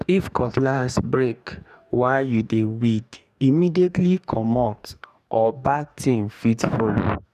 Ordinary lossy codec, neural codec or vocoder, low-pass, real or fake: none; codec, 44.1 kHz, 2.6 kbps, DAC; 14.4 kHz; fake